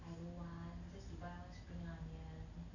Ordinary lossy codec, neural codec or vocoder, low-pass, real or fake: none; none; 7.2 kHz; real